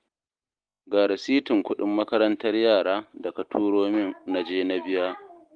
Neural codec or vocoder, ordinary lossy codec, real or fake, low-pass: none; Opus, 24 kbps; real; 9.9 kHz